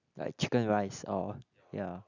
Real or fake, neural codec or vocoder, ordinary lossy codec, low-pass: real; none; none; 7.2 kHz